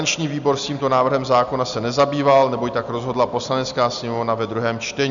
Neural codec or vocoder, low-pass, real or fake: none; 7.2 kHz; real